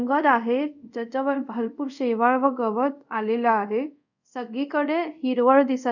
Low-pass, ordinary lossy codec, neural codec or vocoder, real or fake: 7.2 kHz; none; codec, 24 kHz, 0.5 kbps, DualCodec; fake